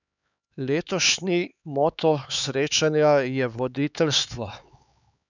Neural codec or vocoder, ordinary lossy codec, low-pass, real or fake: codec, 16 kHz, 4 kbps, X-Codec, HuBERT features, trained on LibriSpeech; none; 7.2 kHz; fake